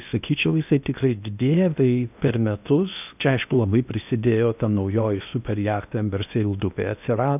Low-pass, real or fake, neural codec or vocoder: 3.6 kHz; fake; codec, 16 kHz in and 24 kHz out, 0.8 kbps, FocalCodec, streaming, 65536 codes